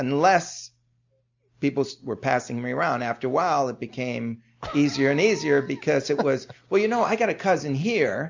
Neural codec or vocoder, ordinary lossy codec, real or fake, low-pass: none; MP3, 64 kbps; real; 7.2 kHz